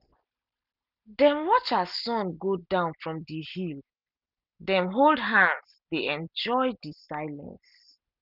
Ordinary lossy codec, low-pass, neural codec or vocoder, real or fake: none; 5.4 kHz; none; real